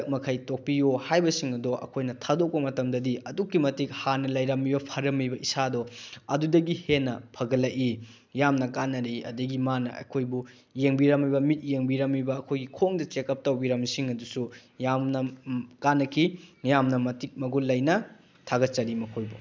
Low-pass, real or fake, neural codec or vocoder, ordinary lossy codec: none; real; none; none